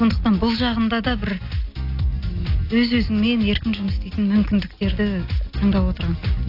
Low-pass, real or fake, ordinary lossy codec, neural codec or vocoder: 5.4 kHz; real; AAC, 32 kbps; none